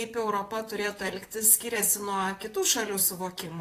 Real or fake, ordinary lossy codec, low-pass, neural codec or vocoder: fake; AAC, 48 kbps; 14.4 kHz; vocoder, 44.1 kHz, 128 mel bands, Pupu-Vocoder